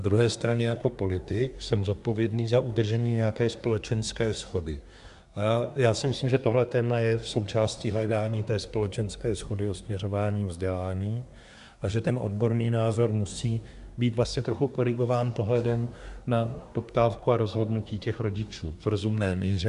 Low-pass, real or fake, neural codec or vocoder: 10.8 kHz; fake; codec, 24 kHz, 1 kbps, SNAC